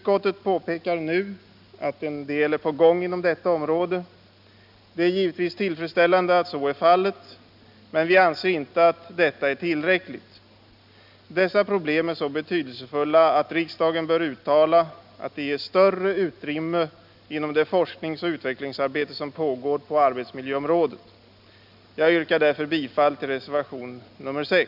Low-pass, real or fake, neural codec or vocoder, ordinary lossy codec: 5.4 kHz; real; none; none